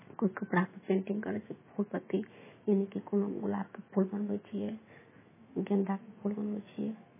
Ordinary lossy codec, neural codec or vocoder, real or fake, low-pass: MP3, 16 kbps; autoencoder, 48 kHz, 128 numbers a frame, DAC-VAE, trained on Japanese speech; fake; 3.6 kHz